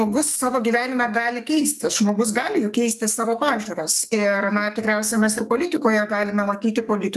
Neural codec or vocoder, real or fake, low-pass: codec, 44.1 kHz, 2.6 kbps, SNAC; fake; 14.4 kHz